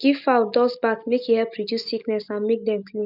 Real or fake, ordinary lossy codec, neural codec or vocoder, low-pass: real; none; none; 5.4 kHz